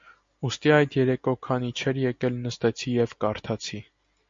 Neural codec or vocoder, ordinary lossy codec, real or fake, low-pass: none; MP3, 48 kbps; real; 7.2 kHz